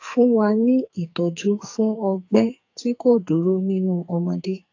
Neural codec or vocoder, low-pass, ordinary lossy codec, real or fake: codec, 44.1 kHz, 2.6 kbps, SNAC; 7.2 kHz; AAC, 48 kbps; fake